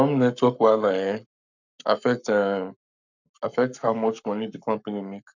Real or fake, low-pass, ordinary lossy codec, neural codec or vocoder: fake; 7.2 kHz; none; codec, 44.1 kHz, 7.8 kbps, Pupu-Codec